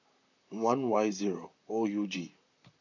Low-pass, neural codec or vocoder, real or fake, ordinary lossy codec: 7.2 kHz; vocoder, 44.1 kHz, 128 mel bands, Pupu-Vocoder; fake; none